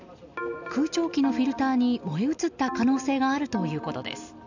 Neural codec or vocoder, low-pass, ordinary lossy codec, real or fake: none; 7.2 kHz; none; real